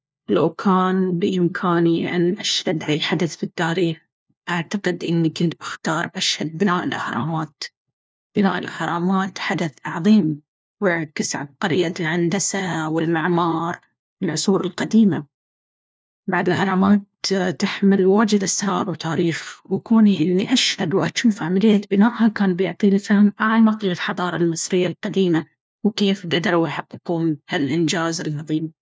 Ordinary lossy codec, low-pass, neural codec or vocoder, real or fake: none; none; codec, 16 kHz, 1 kbps, FunCodec, trained on LibriTTS, 50 frames a second; fake